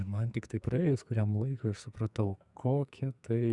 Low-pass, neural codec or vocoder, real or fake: 10.8 kHz; codec, 44.1 kHz, 2.6 kbps, SNAC; fake